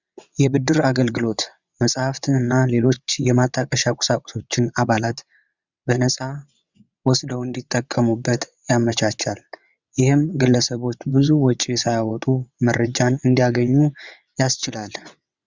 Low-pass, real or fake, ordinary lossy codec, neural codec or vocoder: 7.2 kHz; fake; Opus, 64 kbps; vocoder, 22.05 kHz, 80 mel bands, WaveNeXt